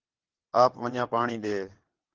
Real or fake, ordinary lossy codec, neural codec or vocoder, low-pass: fake; Opus, 16 kbps; vocoder, 22.05 kHz, 80 mel bands, WaveNeXt; 7.2 kHz